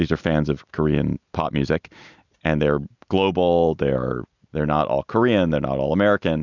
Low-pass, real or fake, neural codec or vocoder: 7.2 kHz; real; none